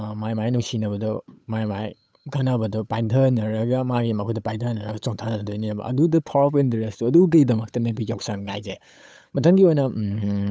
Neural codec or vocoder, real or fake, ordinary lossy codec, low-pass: codec, 16 kHz, 8 kbps, FunCodec, trained on Chinese and English, 25 frames a second; fake; none; none